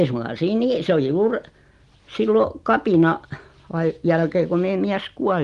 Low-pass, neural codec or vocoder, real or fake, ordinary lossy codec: 10.8 kHz; none; real; Opus, 24 kbps